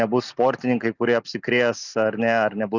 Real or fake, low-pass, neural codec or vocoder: real; 7.2 kHz; none